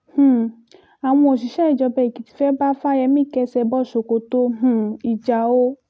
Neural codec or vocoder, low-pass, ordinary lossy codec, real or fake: none; none; none; real